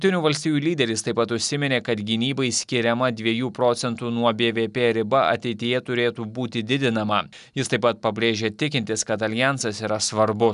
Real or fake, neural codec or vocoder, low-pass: real; none; 10.8 kHz